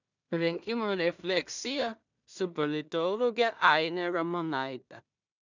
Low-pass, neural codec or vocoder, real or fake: 7.2 kHz; codec, 16 kHz in and 24 kHz out, 0.4 kbps, LongCat-Audio-Codec, two codebook decoder; fake